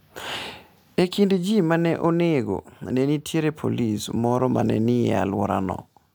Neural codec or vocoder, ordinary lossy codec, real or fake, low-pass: none; none; real; none